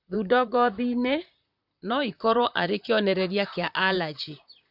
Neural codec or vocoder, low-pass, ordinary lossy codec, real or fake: vocoder, 44.1 kHz, 128 mel bands, Pupu-Vocoder; 5.4 kHz; none; fake